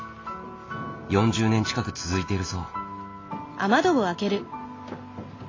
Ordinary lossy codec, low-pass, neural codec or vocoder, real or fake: AAC, 32 kbps; 7.2 kHz; none; real